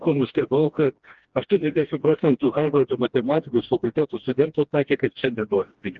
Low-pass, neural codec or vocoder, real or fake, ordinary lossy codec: 7.2 kHz; codec, 16 kHz, 1 kbps, FreqCodec, smaller model; fake; Opus, 16 kbps